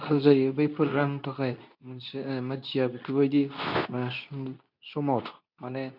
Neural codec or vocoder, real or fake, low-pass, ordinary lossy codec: codec, 24 kHz, 0.9 kbps, WavTokenizer, medium speech release version 2; fake; 5.4 kHz; none